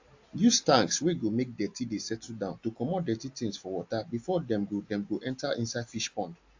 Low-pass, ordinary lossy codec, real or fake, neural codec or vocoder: 7.2 kHz; AAC, 48 kbps; real; none